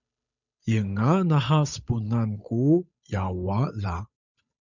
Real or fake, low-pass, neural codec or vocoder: fake; 7.2 kHz; codec, 16 kHz, 8 kbps, FunCodec, trained on Chinese and English, 25 frames a second